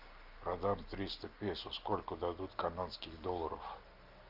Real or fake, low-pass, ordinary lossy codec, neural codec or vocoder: real; 5.4 kHz; Opus, 24 kbps; none